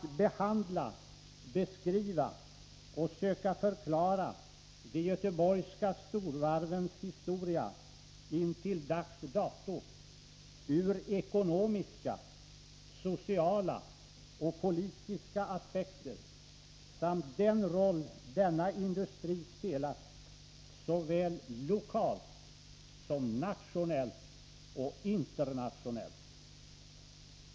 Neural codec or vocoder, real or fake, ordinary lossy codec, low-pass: none; real; none; none